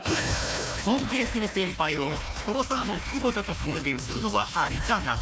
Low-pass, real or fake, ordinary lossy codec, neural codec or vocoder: none; fake; none; codec, 16 kHz, 1 kbps, FunCodec, trained on Chinese and English, 50 frames a second